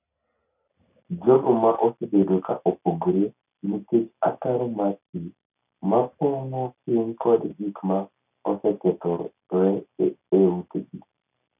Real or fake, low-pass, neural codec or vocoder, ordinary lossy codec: real; 3.6 kHz; none; none